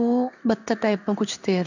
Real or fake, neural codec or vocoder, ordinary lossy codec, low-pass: fake; codec, 16 kHz in and 24 kHz out, 1 kbps, XY-Tokenizer; none; 7.2 kHz